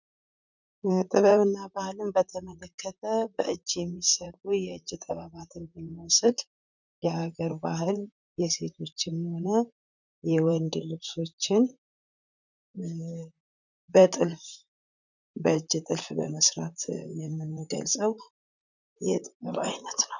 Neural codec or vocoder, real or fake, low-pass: vocoder, 44.1 kHz, 128 mel bands, Pupu-Vocoder; fake; 7.2 kHz